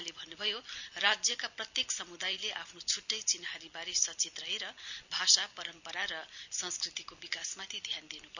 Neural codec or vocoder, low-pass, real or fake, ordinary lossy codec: none; 7.2 kHz; real; none